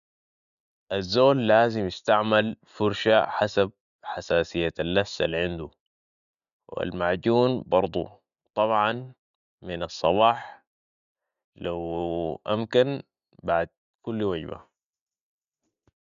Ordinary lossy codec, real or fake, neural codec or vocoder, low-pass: none; real; none; 7.2 kHz